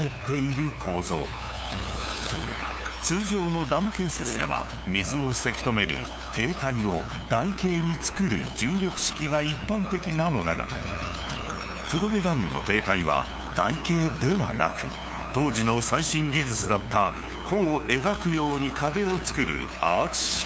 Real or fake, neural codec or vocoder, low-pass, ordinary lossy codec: fake; codec, 16 kHz, 2 kbps, FunCodec, trained on LibriTTS, 25 frames a second; none; none